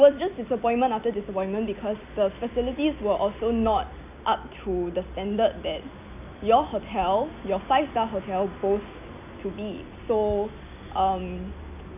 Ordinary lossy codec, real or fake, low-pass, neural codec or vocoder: none; real; 3.6 kHz; none